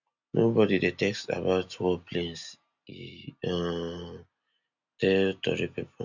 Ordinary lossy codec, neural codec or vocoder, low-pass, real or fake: none; none; 7.2 kHz; real